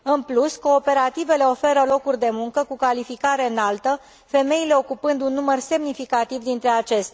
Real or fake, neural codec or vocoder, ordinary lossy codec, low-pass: real; none; none; none